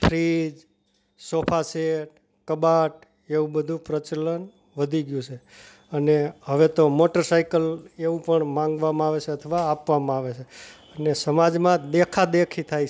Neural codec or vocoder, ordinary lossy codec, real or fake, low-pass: none; none; real; none